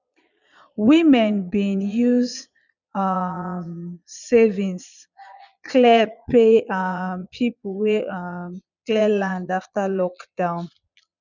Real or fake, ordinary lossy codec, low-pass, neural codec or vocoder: fake; none; 7.2 kHz; vocoder, 22.05 kHz, 80 mel bands, Vocos